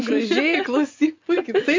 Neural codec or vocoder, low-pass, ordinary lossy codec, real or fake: vocoder, 24 kHz, 100 mel bands, Vocos; 7.2 kHz; MP3, 64 kbps; fake